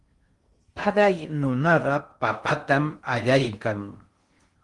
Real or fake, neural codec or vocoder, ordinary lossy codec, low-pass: fake; codec, 16 kHz in and 24 kHz out, 0.6 kbps, FocalCodec, streaming, 2048 codes; Opus, 24 kbps; 10.8 kHz